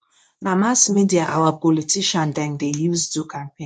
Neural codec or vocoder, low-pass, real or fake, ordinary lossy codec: codec, 24 kHz, 0.9 kbps, WavTokenizer, medium speech release version 2; 9.9 kHz; fake; none